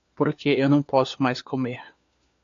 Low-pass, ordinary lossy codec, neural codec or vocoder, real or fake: 7.2 kHz; AAC, 64 kbps; codec, 16 kHz, 4 kbps, FunCodec, trained on LibriTTS, 50 frames a second; fake